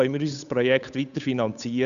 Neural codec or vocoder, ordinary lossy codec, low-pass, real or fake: codec, 16 kHz, 8 kbps, FunCodec, trained on Chinese and English, 25 frames a second; none; 7.2 kHz; fake